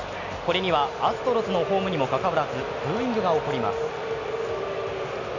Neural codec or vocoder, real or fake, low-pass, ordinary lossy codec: none; real; 7.2 kHz; none